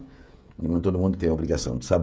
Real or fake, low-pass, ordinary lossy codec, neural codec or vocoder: fake; none; none; codec, 16 kHz, 16 kbps, FreqCodec, smaller model